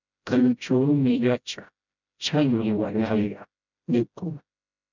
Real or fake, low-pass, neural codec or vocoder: fake; 7.2 kHz; codec, 16 kHz, 0.5 kbps, FreqCodec, smaller model